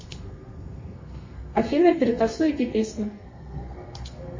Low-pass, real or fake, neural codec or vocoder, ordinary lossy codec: 7.2 kHz; fake; codec, 32 kHz, 1.9 kbps, SNAC; MP3, 32 kbps